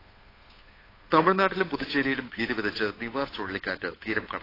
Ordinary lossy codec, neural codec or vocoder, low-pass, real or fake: AAC, 24 kbps; codec, 16 kHz, 8 kbps, FunCodec, trained on Chinese and English, 25 frames a second; 5.4 kHz; fake